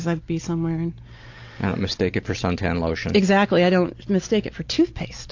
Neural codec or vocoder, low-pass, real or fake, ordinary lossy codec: none; 7.2 kHz; real; AAC, 48 kbps